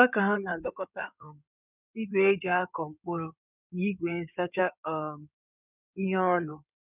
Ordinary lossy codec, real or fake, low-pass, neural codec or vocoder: none; fake; 3.6 kHz; codec, 16 kHz in and 24 kHz out, 2.2 kbps, FireRedTTS-2 codec